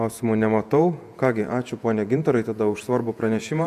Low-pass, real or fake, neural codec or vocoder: 14.4 kHz; fake; vocoder, 48 kHz, 128 mel bands, Vocos